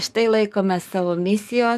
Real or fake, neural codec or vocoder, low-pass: fake; codec, 44.1 kHz, 7.8 kbps, DAC; 14.4 kHz